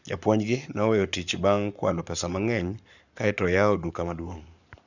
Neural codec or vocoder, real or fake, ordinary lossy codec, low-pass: codec, 44.1 kHz, 7.8 kbps, Pupu-Codec; fake; none; 7.2 kHz